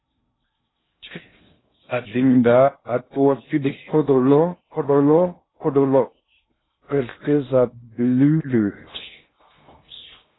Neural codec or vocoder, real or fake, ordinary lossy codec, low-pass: codec, 16 kHz in and 24 kHz out, 0.6 kbps, FocalCodec, streaming, 2048 codes; fake; AAC, 16 kbps; 7.2 kHz